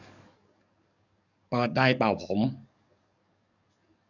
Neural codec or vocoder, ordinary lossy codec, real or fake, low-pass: codec, 44.1 kHz, 7.8 kbps, DAC; none; fake; 7.2 kHz